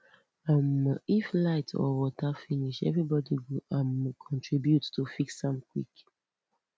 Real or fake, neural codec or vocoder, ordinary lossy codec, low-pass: real; none; none; none